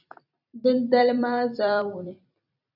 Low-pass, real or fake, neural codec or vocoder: 5.4 kHz; fake; vocoder, 44.1 kHz, 128 mel bands every 256 samples, BigVGAN v2